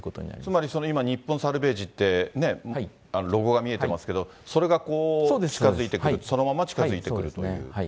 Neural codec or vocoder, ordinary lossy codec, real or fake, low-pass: none; none; real; none